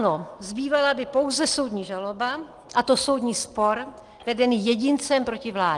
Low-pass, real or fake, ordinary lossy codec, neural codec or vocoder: 10.8 kHz; real; Opus, 24 kbps; none